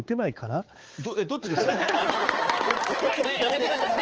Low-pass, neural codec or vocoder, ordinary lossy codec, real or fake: 7.2 kHz; codec, 16 kHz, 4 kbps, X-Codec, HuBERT features, trained on balanced general audio; Opus, 16 kbps; fake